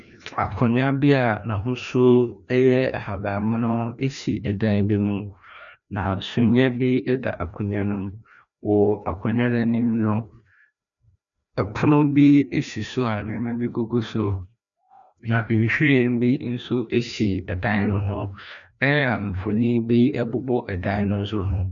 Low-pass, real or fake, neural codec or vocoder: 7.2 kHz; fake; codec, 16 kHz, 1 kbps, FreqCodec, larger model